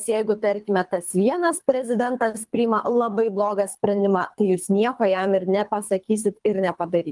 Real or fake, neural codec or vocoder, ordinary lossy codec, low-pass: fake; codec, 24 kHz, 3 kbps, HILCodec; Opus, 32 kbps; 10.8 kHz